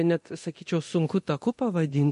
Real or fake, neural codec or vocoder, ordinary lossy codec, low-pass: fake; codec, 24 kHz, 0.9 kbps, DualCodec; MP3, 48 kbps; 10.8 kHz